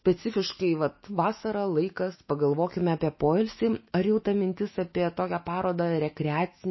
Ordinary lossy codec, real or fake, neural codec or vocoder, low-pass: MP3, 24 kbps; fake; autoencoder, 48 kHz, 128 numbers a frame, DAC-VAE, trained on Japanese speech; 7.2 kHz